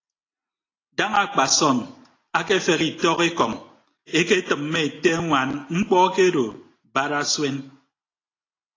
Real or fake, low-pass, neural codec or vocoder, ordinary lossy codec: real; 7.2 kHz; none; AAC, 32 kbps